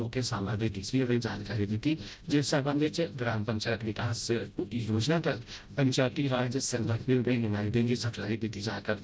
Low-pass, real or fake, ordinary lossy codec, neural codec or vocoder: none; fake; none; codec, 16 kHz, 0.5 kbps, FreqCodec, smaller model